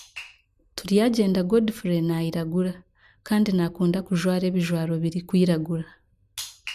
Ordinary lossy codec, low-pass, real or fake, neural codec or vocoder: none; 14.4 kHz; real; none